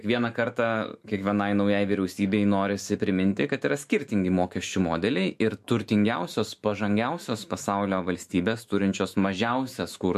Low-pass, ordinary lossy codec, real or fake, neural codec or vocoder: 14.4 kHz; MP3, 64 kbps; fake; autoencoder, 48 kHz, 128 numbers a frame, DAC-VAE, trained on Japanese speech